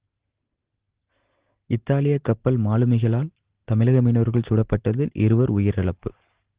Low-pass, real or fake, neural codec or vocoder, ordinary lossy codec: 3.6 kHz; real; none; Opus, 16 kbps